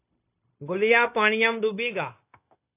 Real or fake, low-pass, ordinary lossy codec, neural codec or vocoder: fake; 3.6 kHz; AAC, 24 kbps; codec, 16 kHz, 0.9 kbps, LongCat-Audio-Codec